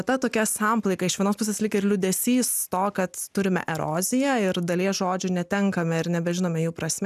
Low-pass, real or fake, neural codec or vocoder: 14.4 kHz; real; none